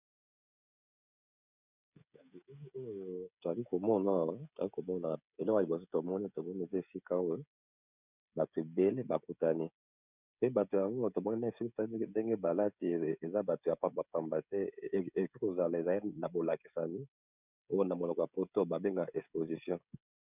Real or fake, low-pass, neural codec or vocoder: fake; 3.6 kHz; codec, 16 kHz, 16 kbps, FreqCodec, smaller model